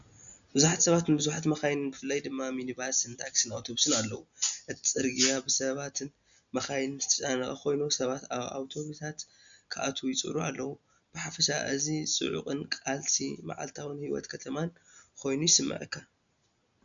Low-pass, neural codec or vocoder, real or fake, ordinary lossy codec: 7.2 kHz; none; real; MP3, 96 kbps